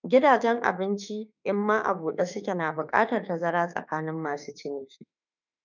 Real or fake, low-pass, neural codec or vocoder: fake; 7.2 kHz; autoencoder, 48 kHz, 32 numbers a frame, DAC-VAE, trained on Japanese speech